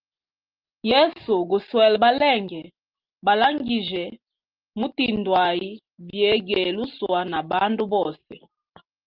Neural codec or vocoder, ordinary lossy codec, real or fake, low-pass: none; Opus, 32 kbps; real; 5.4 kHz